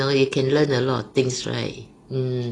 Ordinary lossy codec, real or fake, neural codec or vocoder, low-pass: AAC, 32 kbps; real; none; 9.9 kHz